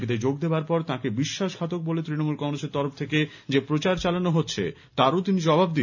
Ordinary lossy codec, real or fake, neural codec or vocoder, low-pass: none; real; none; 7.2 kHz